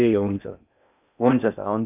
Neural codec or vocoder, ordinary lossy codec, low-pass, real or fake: codec, 16 kHz in and 24 kHz out, 0.6 kbps, FocalCodec, streaming, 4096 codes; none; 3.6 kHz; fake